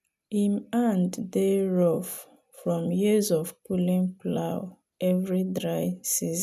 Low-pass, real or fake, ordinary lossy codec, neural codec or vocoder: 14.4 kHz; real; none; none